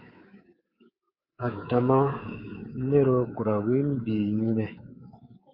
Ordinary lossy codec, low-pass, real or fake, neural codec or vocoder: Opus, 64 kbps; 5.4 kHz; fake; codec, 24 kHz, 3.1 kbps, DualCodec